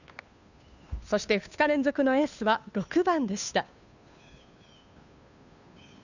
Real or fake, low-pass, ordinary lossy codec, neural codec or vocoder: fake; 7.2 kHz; none; codec, 16 kHz, 2 kbps, FunCodec, trained on Chinese and English, 25 frames a second